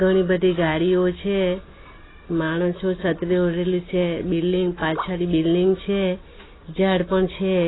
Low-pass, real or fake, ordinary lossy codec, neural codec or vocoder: 7.2 kHz; real; AAC, 16 kbps; none